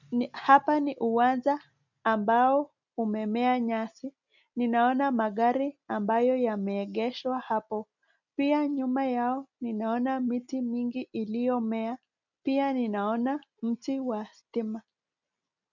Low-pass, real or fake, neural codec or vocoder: 7.2 kHz; real; none